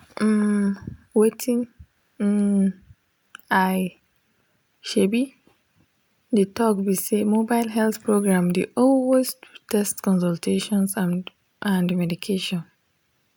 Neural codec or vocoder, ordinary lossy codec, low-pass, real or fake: none; none; none; real